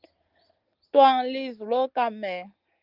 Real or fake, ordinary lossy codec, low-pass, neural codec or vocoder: real; Opus, 32 kbps; 5.4 kHz; none